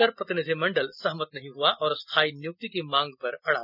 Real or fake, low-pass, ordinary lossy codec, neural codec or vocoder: real; 5.4 kHz; none; none